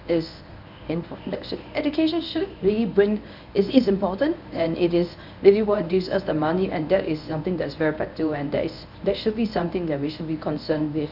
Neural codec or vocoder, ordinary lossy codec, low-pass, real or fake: codec, 24 kHz, 0.9 kbps, WavTokenizer, medium speech release version 1; none; 5.4 kHz; fake